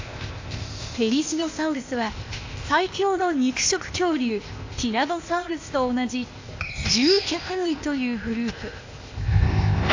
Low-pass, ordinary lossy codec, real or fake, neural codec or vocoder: 7.2 kHz; AAC, 48 kbps; fake; codec, 16 kHz, 0.8 kbps, ZipCodec